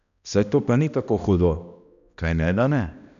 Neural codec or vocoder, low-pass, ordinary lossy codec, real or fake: codec, 16 kHz, 1 kbps, X-Codec, HuBERT features, trained on balanced general audio; 7.2 kHz; none; fake